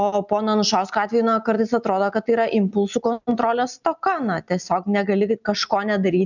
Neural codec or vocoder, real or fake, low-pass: none; real; 7.2 kHz